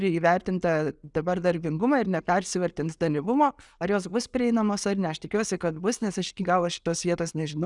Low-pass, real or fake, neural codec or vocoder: 10.8 kHz; real; none